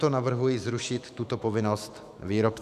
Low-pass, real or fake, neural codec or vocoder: 14.4 kHz; fake; autoencoder, 48 kHz, 128 numbers a frame, DAC-VAE, trained on Japanese speech